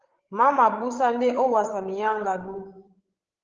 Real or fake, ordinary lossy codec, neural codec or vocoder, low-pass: fake; Opus, 16 kbps; codec, 16 kHz, 16 kbps, FreqCodec, larger model; 7.2 kHz